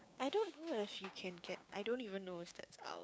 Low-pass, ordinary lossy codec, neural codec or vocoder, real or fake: none; none; codec, 16 kHz, 6 kbps, DAC; fake